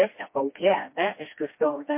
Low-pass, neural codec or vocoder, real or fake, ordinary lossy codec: 3.6 kHz; codec, 16 kHz, 1 kbps, FreqCodec, smaller model; fake; MP3, 24 kbps